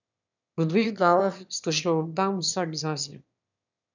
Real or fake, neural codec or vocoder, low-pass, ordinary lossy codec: fake; autoencoder, 22.05 kHz, a latent of 192 numbers a frame, VITS, trained on one speaker; 7.2 kHz; none